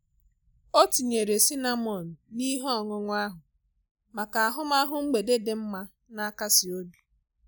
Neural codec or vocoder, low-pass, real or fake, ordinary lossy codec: none; none; real; none